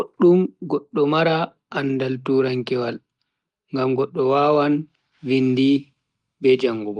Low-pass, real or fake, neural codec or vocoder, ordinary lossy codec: 10.8 kHz; real; none; Opus, 32 kbps